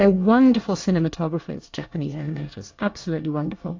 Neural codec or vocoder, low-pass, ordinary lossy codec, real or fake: codec, 24 kHz, 1 kbps, SNAC; 7.2 kHz; AAC, 48 kbps; fake